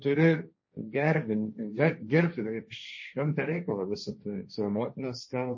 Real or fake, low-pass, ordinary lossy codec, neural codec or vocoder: fake; 7.2 kHz; MP3, 32 kbps; codec, 16 kHz, 1.1 kbps, Voila-Tokenizer